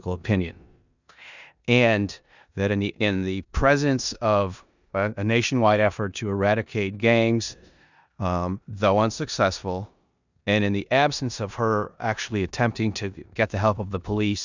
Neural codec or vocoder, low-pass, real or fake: codec, 16 kHz in and 24 kHz out, 0.9 kbps, LongCat-Audio-Codec, four codebook decoder; 7.2 kHz; fake